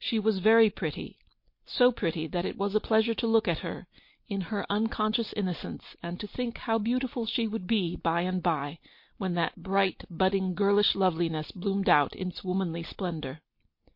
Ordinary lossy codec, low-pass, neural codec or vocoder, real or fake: MP3, 32 kbps; 5.4 kHz; none; real